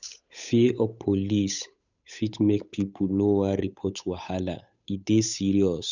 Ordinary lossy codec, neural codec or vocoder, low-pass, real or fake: none; codec, 16 kHz, 8 kbps, FunCodec, trained on Chinese and English, 25 frames a second; 7.2 kHz; fake